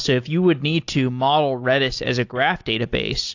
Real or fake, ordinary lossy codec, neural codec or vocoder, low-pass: real; AAC, 48 kbps; none; 7.2 kHz